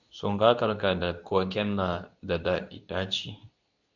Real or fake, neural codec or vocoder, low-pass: fake; codec, 24 kHz, 0.9 kbps, WavTokenizer, medium speech release version 2; 7.2 kHz